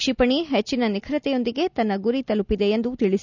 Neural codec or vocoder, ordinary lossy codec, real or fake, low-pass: none; none; real; 7.2 kHz